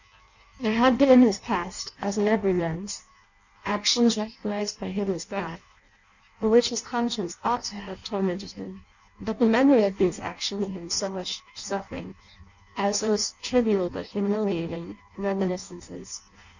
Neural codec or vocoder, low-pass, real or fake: codec, 16 kHz in and 24 kHz out, 0.6 kbps, FireRedTTS-2 codec; 7.2 kHz; fake